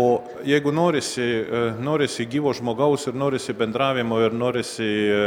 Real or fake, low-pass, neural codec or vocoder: real; 19.8 kHz; none